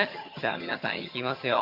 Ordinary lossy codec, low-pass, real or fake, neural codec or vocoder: MP3, 48 kbps; 5.4 kHz; fake; vocoder, 22.05 kHz, 80 mel bands, HiFi-GAN